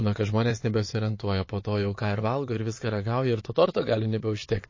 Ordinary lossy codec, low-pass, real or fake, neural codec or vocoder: MP3, 32 kbps; 7.2 kHz; fake; vocoder, 22.05 kHz, 80 mel bands, Vocos